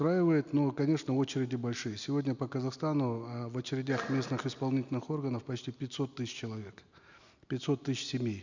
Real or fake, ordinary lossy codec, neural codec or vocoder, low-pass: real; none; none; 7.2 kHz